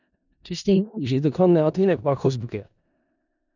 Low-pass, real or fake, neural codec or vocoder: 7.2 kHz; fake; codec, 16 kHz in and 24 kHz out, 0.4 kbps, LongCat-Audio-Codec, four codebook decoder